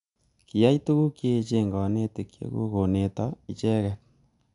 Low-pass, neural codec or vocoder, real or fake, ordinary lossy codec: 10.8 kHz; none; real; none